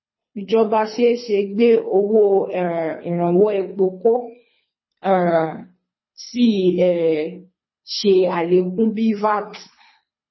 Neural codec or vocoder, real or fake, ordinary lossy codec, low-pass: codec, 24 kHz, 3 kbps, HILCodec; fake; MP3, 24 kbps; 7.2 kHz